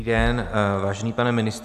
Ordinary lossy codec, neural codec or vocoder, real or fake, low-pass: AAC, 96 kbps; none; real; 14.4 kHz